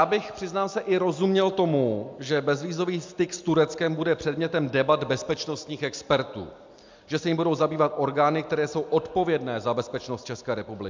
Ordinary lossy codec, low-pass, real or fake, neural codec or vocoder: MP3, 64 kbps; 7.2 kHz; real; none